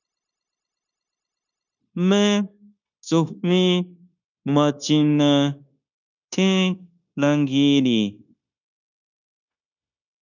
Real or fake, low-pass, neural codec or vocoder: fake; 7.2 kHz; codec, 16 kHz, 0.9 kbps, LongCat-Audio-Codec